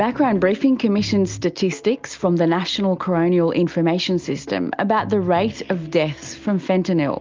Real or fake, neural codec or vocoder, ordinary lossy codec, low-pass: real; none; Opus, 24 kbps; 7.2 kHz